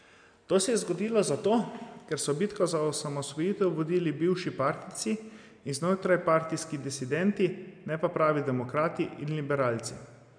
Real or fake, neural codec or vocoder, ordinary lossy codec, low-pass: real; none; none; 9.9 kHz